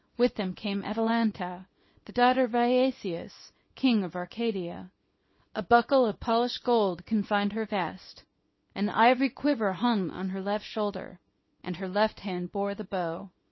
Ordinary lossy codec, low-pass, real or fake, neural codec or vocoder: MP3, 24 kbps; 7.2 kHz; fake; codec, 24 kHz, 0.9 kbps, WavTokenizer, small release